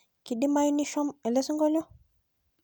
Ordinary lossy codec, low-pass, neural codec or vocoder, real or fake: none; none; none; real